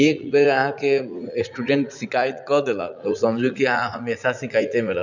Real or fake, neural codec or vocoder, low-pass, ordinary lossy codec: fake; vocoder, 44.1 kHz, 80 mel bands, Vocos; 7.2 kHz; none